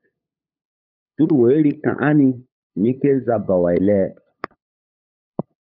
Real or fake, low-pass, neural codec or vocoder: fake; 5.4 kHz; codec, 16 kHz, 8 kbps, FunCodec, trained on LibriTTS, 25 frames a second